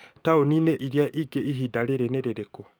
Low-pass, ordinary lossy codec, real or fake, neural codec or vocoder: none; none; fake; codec, 44.1 kHz, 7.8 kbps, DAC